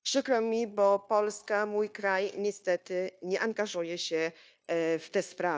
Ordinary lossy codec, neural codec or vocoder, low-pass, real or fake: none; codec, 16 kHz, 0.9 kbps, LongCat-Audio-Codec; none; fake